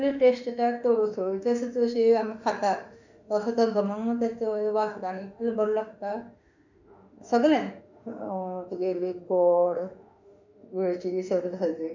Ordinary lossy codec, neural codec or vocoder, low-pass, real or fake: none; autoencoder, 48 kHz, 32 numbers a frame, DAC-VAE, trained on Japanese speech; 7.2 kHz; fake